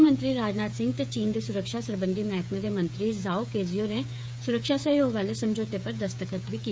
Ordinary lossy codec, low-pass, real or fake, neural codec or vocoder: none; none; fake; codec, 16 kHz, 8 kbps, FreqCodec, smaller model